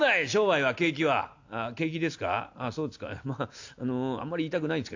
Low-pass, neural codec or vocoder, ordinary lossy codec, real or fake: 7.2 kHz; none; AAC, 48 kbps; real